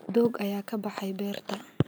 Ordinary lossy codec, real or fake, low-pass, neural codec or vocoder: none; real; none; none